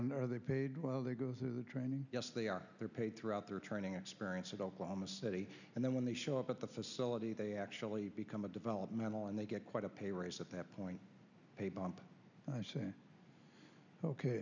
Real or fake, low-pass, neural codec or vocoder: real; 7.2 kHz; none